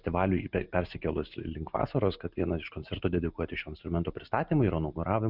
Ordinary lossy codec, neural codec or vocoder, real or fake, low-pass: Opus, 64 kbps; none; real; 5.4 kHz